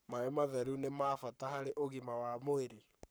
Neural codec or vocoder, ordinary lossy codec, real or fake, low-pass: codec, 44.1 kHz, 7.8 kbps, Pupu-Codec; none; fake; none